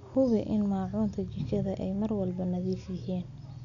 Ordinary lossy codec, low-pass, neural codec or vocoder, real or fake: none; 7.2 kHz; none; real